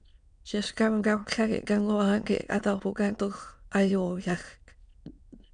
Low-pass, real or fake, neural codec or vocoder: 9.9 kHz; fake; autoencoder, 22.05 kHz, a latent of 192 numbers a frame, VITS, trained on many speakers